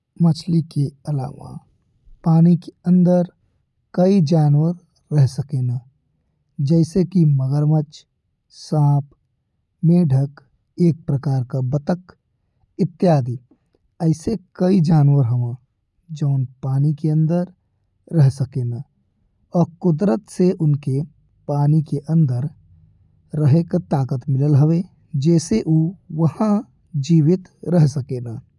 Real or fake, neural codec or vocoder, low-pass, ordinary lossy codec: real; none; none; none